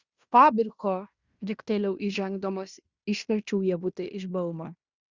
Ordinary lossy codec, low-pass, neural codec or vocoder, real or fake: Opus, 64 kbps; 7.2 kHz; codec, 16 kHz in and 24 kHz out, 0.9 kbps, LongCat-Audio-Codec, fine tuned four codebook decoder; fake